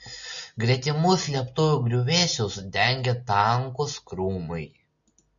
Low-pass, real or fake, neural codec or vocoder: 7.2 kHz; real; none